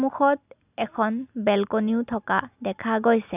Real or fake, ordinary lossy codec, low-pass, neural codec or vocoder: real; none; 3.6 kHz; none